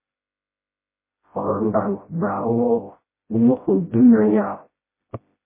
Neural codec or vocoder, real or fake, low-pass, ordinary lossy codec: codec, 16 kHz, 0.5 kbps, FreqCodec, smaller model; fake; 3.6 kHz; MP3, 16 kbps